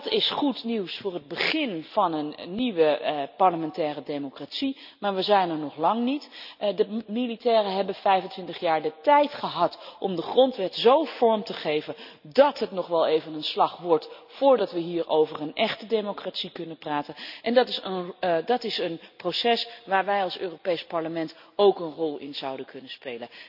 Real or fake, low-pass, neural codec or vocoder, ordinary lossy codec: real; 5.4 kHz; none; none